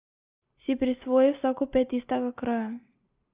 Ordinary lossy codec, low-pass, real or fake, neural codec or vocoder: Opus, 24 kbps; 3.6 kHz; real; none